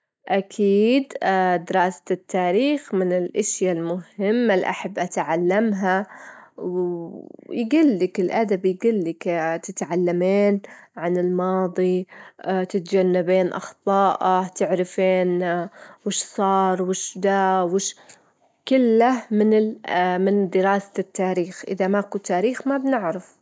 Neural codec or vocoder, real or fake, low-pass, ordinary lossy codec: none; real; none; none